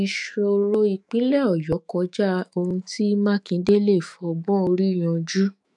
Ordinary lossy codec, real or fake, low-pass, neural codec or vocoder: none; fake; 10.8 kHz; autoencoder, 48 kHz, 128 numbers a frame, DAC-VAE, trained on Japanese speech